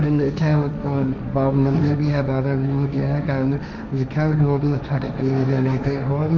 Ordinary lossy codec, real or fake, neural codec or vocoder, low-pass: none; fake; codec, 16 kHz, 1.1 kbps, Voila-Tokenizer; none